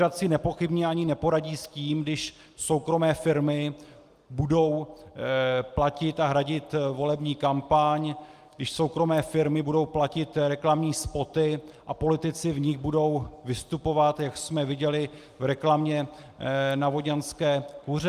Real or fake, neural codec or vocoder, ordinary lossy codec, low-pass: real; none; Opus, 32 kbps; 14.4 kHz